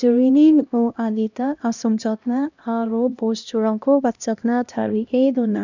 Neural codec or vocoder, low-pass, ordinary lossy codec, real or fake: codec, 16 kHz, 1 kbps, X-Codec, HuBERT features, trained on LibriSpeech; 7.2 kHz; none; fake